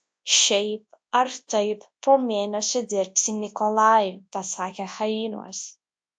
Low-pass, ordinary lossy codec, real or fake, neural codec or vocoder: 9.9 kHz; AAC, 64 kbps; fake; codec, 24 kHz, 0.9 kbps, WavTokenizer, large speech release